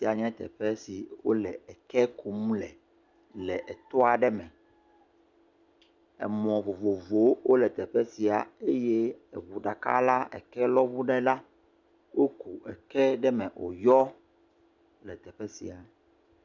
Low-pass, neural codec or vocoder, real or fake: 7.2 kHz; none; real